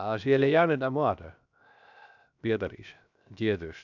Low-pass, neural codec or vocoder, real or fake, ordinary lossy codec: 7.2 kHz; codec, 16 kHz, 0.7 kbps, FocalCodec; fake; none